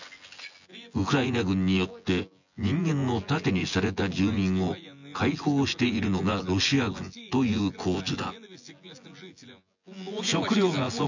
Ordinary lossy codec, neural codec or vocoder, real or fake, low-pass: none; vocoder, 24 kHz, 100 mel bands, Vocos; fake; 7.2 kHz